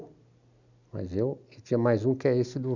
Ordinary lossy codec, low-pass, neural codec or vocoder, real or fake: none; 7.2 kHz; none; real